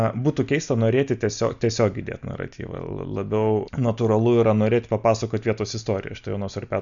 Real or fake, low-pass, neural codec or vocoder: real; 7.2 kHz; none